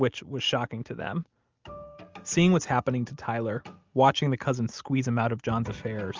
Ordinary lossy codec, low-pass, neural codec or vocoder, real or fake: Opus, 32 kbps; 7.2 kHz; none; real